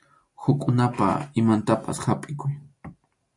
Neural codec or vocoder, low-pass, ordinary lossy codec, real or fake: none; 10.8 kHz; MP3, 64 kbps; real